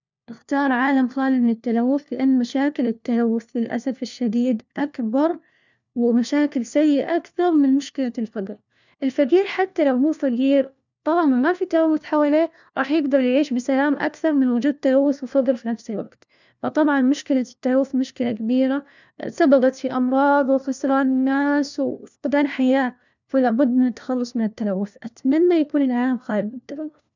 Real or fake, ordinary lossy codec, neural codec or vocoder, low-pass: fake; none; codec, 16 kHz, 1 kbps, FunCodec, trained on LibriTTS, 50 frames a second; 7.2 kHz